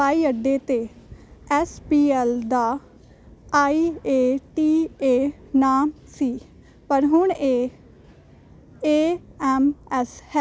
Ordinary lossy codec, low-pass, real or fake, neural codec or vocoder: none; none; real; none